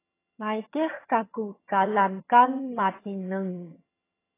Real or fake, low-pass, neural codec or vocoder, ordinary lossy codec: fake; 3.6 kHz; vocoder, 22.05 kHz, 80 mel bands, HiFi-GAN; AAC, 16 kbps